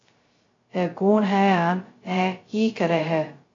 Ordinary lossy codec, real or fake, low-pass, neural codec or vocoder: AAC, 32 kbps; fake; 7.2 kHz; codec, 16 kHz, 0.2 kbps, FocalCodec